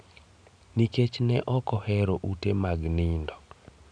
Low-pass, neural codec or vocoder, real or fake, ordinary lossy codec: 9.9 kHz; none; real; none